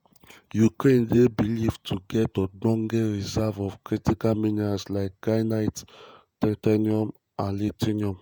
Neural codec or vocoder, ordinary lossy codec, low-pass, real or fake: vocoder, 48 kHz, 128 mel bands, Vocos; none; none; fake